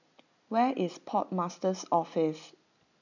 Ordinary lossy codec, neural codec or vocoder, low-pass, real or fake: none; none; 7.2 kHz; real